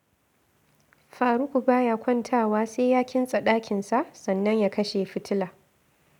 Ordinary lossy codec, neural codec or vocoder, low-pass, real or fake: none; none; 19.8 kHz; real